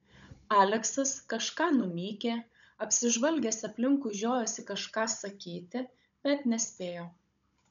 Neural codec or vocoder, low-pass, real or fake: codec, 16 kHz, 16 kbps, FunCodec, trained on Chinese and English, 50 frames a second; 7.2 kHz; fake